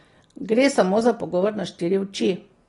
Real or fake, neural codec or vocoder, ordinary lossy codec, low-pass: real; none; AAC, 32 kbps; 10.8 kHz